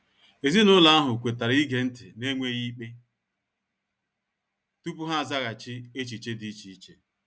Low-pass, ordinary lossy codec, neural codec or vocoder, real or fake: none; none; none; real